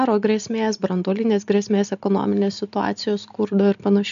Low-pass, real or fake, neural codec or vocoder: 7.2 kHz; real; none